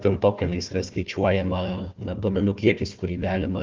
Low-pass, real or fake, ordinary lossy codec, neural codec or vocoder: 7.2 kHz; fake; Opus, 24 kbps; codec, 16 kHz, 1 kbps, FunCodec, trained on Chinese and English, 50 frames a second